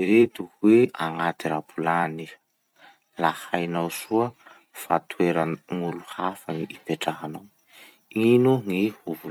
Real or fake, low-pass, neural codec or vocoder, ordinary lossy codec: fake; 19.8 kHz; vocoder, 44.1 kHz, 128 mel bands every 256 samples, BigVGAN v2; none